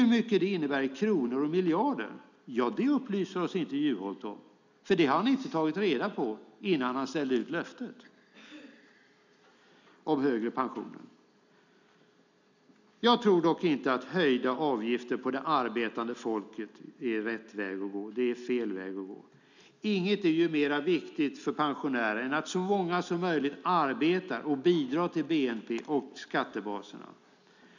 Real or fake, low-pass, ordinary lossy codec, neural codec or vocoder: real; 7.2 kHz; none; none